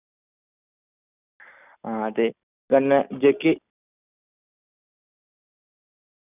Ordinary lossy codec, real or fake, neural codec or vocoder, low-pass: none; real; none; 3.6 kHz